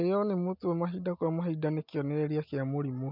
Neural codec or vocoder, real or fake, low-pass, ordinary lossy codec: none; real; 5.4 kHz; none